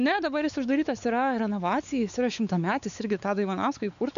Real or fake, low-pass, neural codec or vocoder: fake; 7.2 kHz; codec, 16 kHz, 4 kbps, X-Codec, WavLM features, trained on Multilingual LibriSpeech